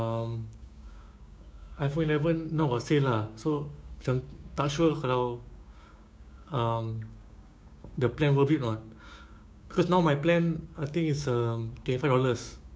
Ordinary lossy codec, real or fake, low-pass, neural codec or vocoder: none; fake; none; codec, 16 kHz, 6 kbps, DAC